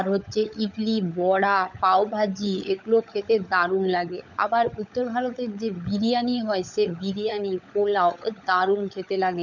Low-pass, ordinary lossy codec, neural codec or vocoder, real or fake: 7.2 kHz; none; codec, 16 kHz, 16 kbps, FunCodec, trained on LibriTTS, 50 frames a second; fake